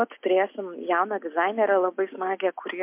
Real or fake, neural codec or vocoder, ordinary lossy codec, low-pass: real; none; MP3, 32 kbps; 3.6 kHz